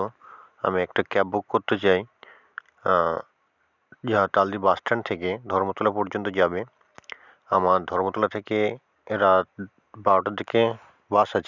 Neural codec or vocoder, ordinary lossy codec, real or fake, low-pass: none; none; real; 7.2 kHz